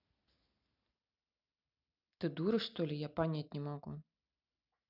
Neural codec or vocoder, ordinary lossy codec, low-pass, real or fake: none; none; 5.4 kHz; real